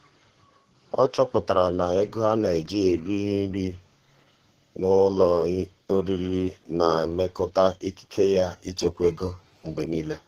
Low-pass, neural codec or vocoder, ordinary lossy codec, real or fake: 14.4 kHz; codec, 32 kHz, 1.9 kbps, SNAC; Opus, 16 kbps; fake